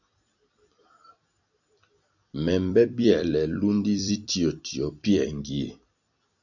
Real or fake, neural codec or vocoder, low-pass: fake; vocoder, 44.1 kHz, 128 mel bands every 256 samples, BigVGAN v2; 7.2 kHz